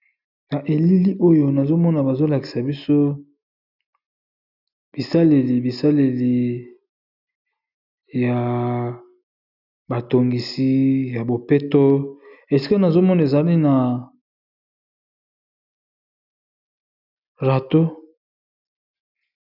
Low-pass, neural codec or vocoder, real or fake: 5.4 kHz; none; real